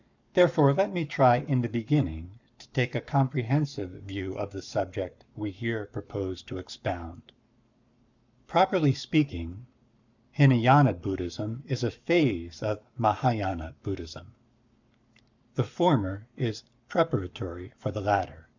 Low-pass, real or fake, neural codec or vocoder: 7.2 kHz; fake; codec, 44.1 kHz, 7.8 kbps, Pupu-Codec